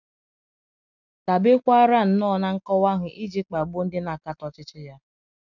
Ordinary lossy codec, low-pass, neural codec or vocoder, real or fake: none; 7.2 kHz; none; real